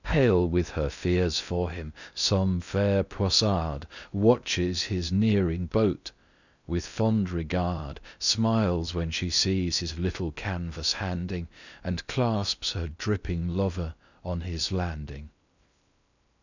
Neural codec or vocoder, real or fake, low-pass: codec, 16 kHz in and 24 kHz out, 0.6 kbps, FocalCodec, streaming, 4096 codes; fake; 7.2 kHz